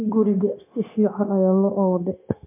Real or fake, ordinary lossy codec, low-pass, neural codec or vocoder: fake; MP3, 32 kbps; 3.6 kHz; codec, 16 kHz, 0.9 kbps, LongCat-Audio-Codec